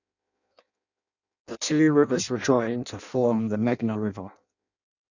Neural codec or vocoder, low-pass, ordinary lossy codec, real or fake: codec, 16 kHz in and 24 kHz out, 0.6 kbps, FireRedTTS-2 codec; 7.2 kHz; none; fake